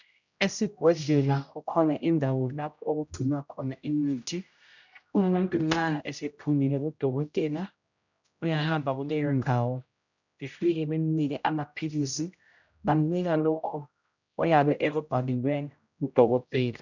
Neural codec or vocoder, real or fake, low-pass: codec, 16 kHz, 0.5 kbps, X-Codec, HuBERT features, trained on general audio; fake; 7.2 kHz